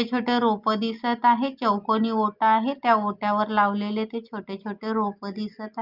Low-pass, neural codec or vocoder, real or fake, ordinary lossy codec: 5.4 kHz; none; real; Opus, 24 kbps